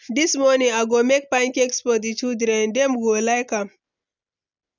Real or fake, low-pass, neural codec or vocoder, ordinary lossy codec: real; 7.2 kHz; none; none